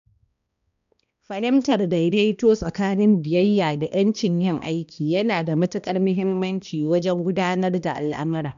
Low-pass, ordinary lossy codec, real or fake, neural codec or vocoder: 7.2 kHz; none; fake; codec, 16 kHz, 1 kbps, X-Codec, HuBERT features, trained on balanced general audio